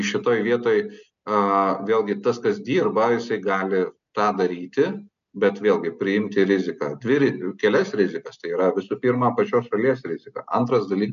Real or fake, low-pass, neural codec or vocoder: real; 7.2 kHz; none